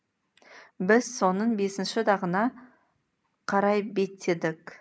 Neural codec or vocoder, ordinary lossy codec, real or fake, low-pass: none; none; real; none